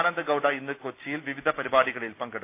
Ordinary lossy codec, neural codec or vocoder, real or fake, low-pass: AAC, 32 kbps; none; real; 3.6 kHz